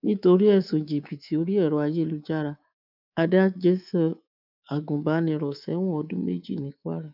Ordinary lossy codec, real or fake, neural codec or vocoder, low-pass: none; fake; codec, 24 kHz, 3.1 kbps, DualCodec; 5.4 kHz